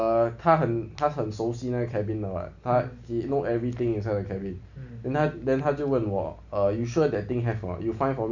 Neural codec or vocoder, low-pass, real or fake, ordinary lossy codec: none; 7.2 kHz; real; none